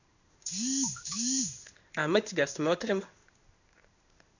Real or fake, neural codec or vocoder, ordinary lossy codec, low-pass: fake; codec, 16 kHz in and 24 kHz out, 1 kbps, XY-Tokenizer; none; 7.2 kHz